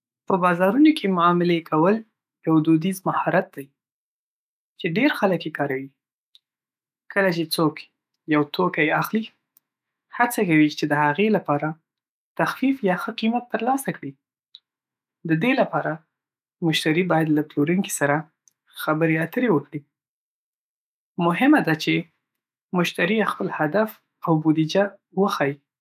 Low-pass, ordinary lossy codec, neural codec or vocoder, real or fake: 14.4 kHz; none; autoencoder, 48 kHz, 128 numbers a frame, DAC-VAE, trained on Japanese speech; fake